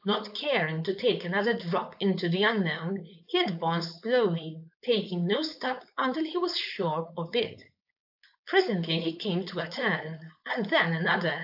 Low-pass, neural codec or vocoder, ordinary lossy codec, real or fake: 5.4 kHz; codec, 16 kHz, 4.8 kbps, FACodec; AAC, 48 kbps; fake